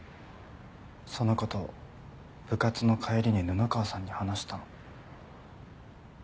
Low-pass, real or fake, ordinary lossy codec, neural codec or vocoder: none; real; none; none